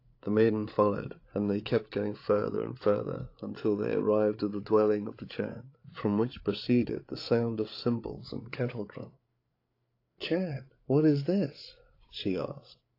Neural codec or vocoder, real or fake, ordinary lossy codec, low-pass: codec, 16 kHz, 8 kbps, FreqCodec, larger model; fake; AAC, 32 kbps; 5.4 kHz